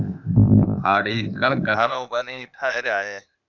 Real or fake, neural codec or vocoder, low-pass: fake; codec, 16 kHz, 0.8 kbps, ZipCodec; 7.2 kHz